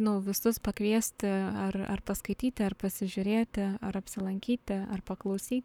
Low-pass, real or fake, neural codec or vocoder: 19.8 kHz; fake; codec, 44.1 kHz, 7.8 kbps, Pupu-Codec